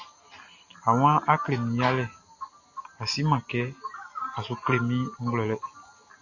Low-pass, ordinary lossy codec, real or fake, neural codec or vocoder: 7.2 kHz; AAC, 48 kbps; real; none